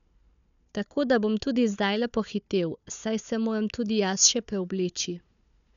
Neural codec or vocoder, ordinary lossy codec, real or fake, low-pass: codec, 16 kHz, 4 kbps, FunCodec, trained on Chinese and English, 50 frames a second; none; fake; 7.2 kHz